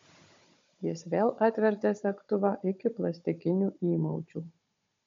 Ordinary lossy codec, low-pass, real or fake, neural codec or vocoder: MP3, 48 kbps; 7.2 kHz; fake; codec, 16 kHz, 16 kbps, FunCodec, trained on Chinese and English, 50 frames a second